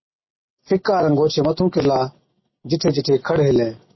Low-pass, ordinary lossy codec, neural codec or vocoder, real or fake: 7.2 kHz; MP3, 24 kbps; none; real